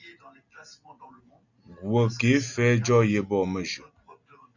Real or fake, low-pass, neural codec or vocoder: real; 7.2 kHz; none